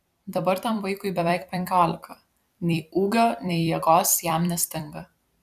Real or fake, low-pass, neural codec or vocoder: fake; 14.4 kHz; vocoder, 44.1 kHz, 128 mel bands every 256 samples, BigVGAN v2